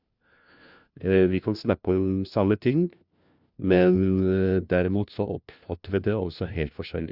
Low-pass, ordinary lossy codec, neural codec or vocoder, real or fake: 5.4 kHz; Opus, 64 kbps; codec, 16 kHz, 1 kbps, FunCodec, trained on LibriTTS, 50 frames a second; fake